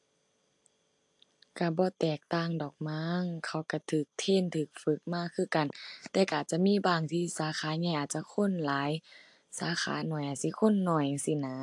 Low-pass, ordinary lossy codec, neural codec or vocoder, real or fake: 10.8 kHz; AAC, 64 kbps; none; real